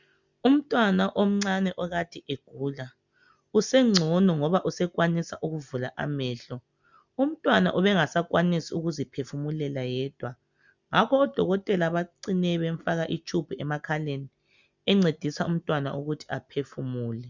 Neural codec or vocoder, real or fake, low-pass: none; real; 7.2 kHz